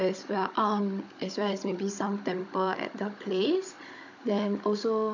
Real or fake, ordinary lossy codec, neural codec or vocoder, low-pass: fake; none; codec, 16 kHz, 16 kbps, FunCodec, trained on Chinese and English, 50 frames a second; 7.2 kHz